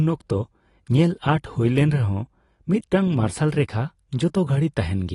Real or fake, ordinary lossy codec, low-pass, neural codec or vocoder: real; AAC, 32 kbps; 10.8 kHz; none